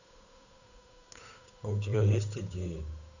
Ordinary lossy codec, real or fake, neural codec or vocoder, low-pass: none; fake; codec, 16 kHz, 8 kbps, FunCodec, trained on Chinese and English, 25 frames a second; 7.2 kHz